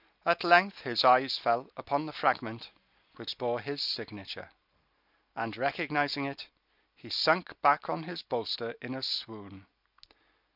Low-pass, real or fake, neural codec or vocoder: 5.4 kHz; real; none